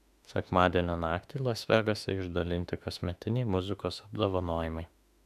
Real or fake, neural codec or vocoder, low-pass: fake; autoencoder, 48 kHz, 32 numbers a frame, DAC-VAE, trained on Japanese speech; 14.4 kHz